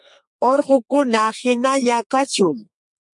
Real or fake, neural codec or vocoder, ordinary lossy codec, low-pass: fake; codec, 32 kHz, 1.9 kbps, SNAC; MP3, 64 kbps; 10.8 kHz